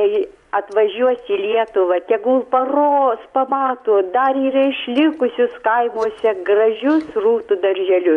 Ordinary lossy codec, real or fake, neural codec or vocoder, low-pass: MP3, 96 kbps; fake; vocoder, 24 kHz, 100 mel bands, Vocos; 10.8 kHz